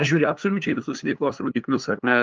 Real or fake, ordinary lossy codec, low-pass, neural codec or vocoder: fake; Opus, 24 kbps; 7.2 kHz; codec, 16 kHz, 2 kbps, FunCodec, trained on LibriTTS, 25 frames a second